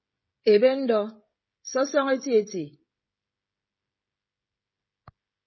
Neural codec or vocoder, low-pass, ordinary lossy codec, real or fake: codec, 16 kHz, 16 kbps, FreqCodec, smaller model; 7.2 kHz; MP3, 24 kbps; fake